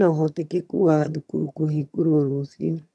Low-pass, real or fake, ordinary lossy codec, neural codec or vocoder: none; fake; none; vocoder, 22.05 kHz, 80 mel bands, HiFi-GAN